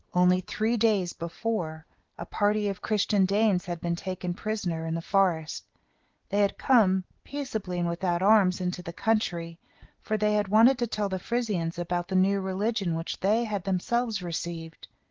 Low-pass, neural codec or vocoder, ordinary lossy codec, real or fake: 7.2 kHz; none; Opus, 16 kbps; real